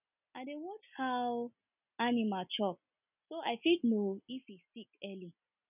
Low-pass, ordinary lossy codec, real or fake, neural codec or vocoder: 3.6 kHz; none; real; none